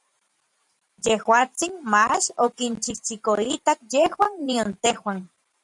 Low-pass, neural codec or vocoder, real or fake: 10.8 kHz; vocoder, 44.1 kHz, 128 mel bands every 512 samples, BigVGAN v2; fake